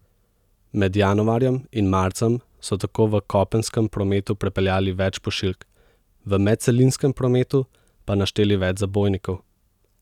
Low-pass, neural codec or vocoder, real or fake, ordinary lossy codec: 19.8 kHz; none; real; none